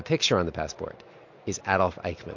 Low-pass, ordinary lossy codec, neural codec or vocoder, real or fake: 7.2 kHz; MP3, 48 kbps; none; real